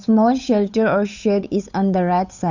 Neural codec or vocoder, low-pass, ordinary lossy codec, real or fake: codec, 16 kHz, 8 kbps, FunCodec, trained on Chinese and English, 25 frames a second; 7.2 kHz; none; fake